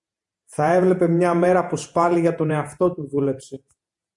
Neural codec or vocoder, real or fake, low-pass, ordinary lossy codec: vocoder, 48 kHz, 128 mel bands, Vocos; fake; 10.8 kHz; MP3, 64 kbps